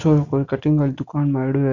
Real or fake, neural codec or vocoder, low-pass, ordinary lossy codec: real; none; 7.2 kHz; none